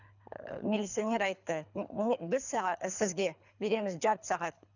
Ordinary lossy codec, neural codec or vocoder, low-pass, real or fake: none; codec, 24 kHz, 3 kbps, HILCodec; 7.2 kHz; fake